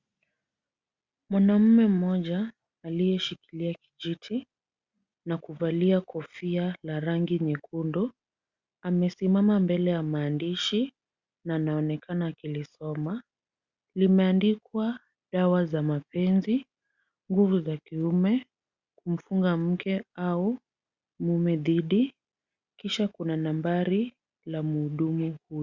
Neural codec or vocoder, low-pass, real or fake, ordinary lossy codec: none; 7.2 kHz; real; Opus, 64 kbps